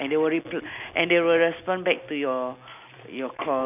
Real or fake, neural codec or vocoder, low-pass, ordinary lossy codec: real; none; 3.6 kHz; none